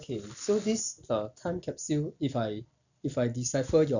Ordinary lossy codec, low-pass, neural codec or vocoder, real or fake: none; 7.2 kHz; none; real